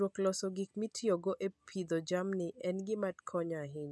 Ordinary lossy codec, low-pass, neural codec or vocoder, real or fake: none; none; none; real